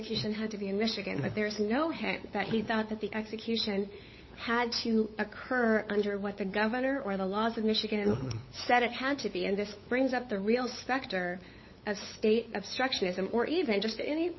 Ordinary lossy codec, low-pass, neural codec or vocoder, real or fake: MP3, 24 kbps; 7.2 kHz; codec, 16 kHz, 8 kbps, FunCodec, trained on LibriTTS, 25 frames a second; fake